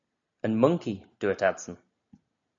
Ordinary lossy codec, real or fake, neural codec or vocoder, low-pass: MP3, 64 kbps; real; none; 7.2 kHz